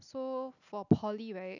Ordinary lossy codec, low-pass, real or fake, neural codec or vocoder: none; 7.2 kHz; real; none